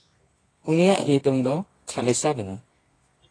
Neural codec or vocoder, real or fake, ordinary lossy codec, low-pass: codec, 24 kHz, 0.9 kbps, WavTokenizer, medium music audio release; fake; AAC, 32 kbps; 9.9 kHz